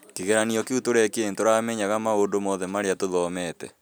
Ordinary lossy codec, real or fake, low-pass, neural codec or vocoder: none; real; none; none